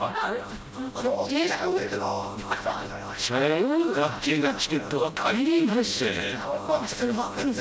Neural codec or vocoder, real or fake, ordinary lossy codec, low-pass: codec, 16 kHz, 0.5 kbps, FreqCodec, smaller model; fake; none; none